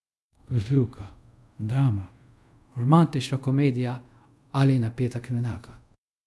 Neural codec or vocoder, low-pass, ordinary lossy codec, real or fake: codec, 24 kHz, 0.5 kbps, DualCodec; none; none; fake